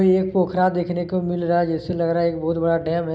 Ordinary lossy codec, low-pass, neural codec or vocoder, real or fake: none; none; none; real